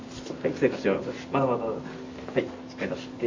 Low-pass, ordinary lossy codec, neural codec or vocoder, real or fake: 7.2 kHz; MP3, 32 kbps; none; real